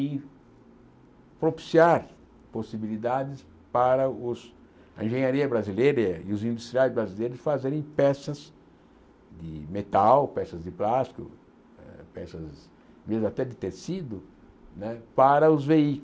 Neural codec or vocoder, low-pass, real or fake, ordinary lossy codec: none; none; real; none